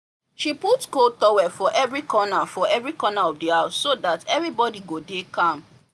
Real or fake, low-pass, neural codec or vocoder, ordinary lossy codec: real; none; none; none